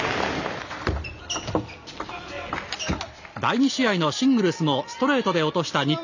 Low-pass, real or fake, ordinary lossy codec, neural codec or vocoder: 7.2 kHz; real; none; none